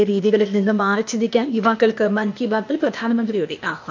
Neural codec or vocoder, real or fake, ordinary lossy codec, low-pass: codec, 16 kHz, 0.8 kbps, ZipCodec; fake; AAC, 48 kbps; 7.2 kHz